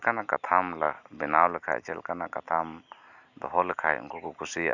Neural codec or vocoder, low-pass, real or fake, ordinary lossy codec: none; 7.2 kHz; real; none